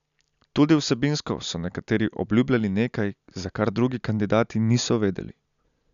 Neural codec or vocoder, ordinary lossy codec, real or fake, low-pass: none; none; real; 7.2 kHz